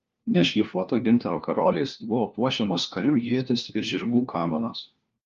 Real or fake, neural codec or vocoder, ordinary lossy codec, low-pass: fake; codec, 16 kHz, 1 kbps, FunCodec, trained on LibriTTS, 50 frames a second; Opus, 32 kbps; 7.2 kHz